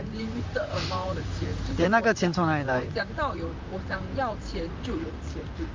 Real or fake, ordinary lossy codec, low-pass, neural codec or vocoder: fake; Opus, 32 kbps; 7.2 kHz; vocoder, 44.1 kHz, 128 mel bands, Pupu-Vocoder